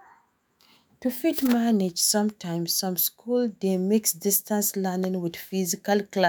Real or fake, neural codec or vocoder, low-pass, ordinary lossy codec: fake; autoencoder, 48 kHz, 128 numbers a frame, DAC-VAE, trained on Japanese speech; none; none